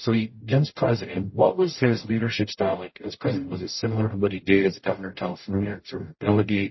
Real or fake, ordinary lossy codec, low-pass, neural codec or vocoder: fake; MP3, 24 kbps; 7.2 kHz; codec, 44.1 kHz, 0.9 kbps, DAC